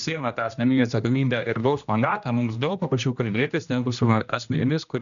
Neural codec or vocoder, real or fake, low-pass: codec, 16 kHz, 1 kbps, X-Codec, HuBERT features, trained on general audio; fake; 7.2 kHz